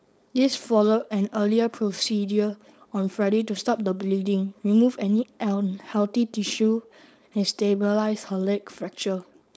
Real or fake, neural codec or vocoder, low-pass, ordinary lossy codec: fake; codec, 16 kHz, 4.8 kbps, FACodec; none; none